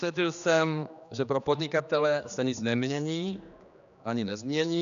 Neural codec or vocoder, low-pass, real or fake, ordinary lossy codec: codec, 16 kHz, 2 kbps, X-Codec, HuBERT features, trained on general audio; 7.2 kHz; fake; MP3, 96 kbps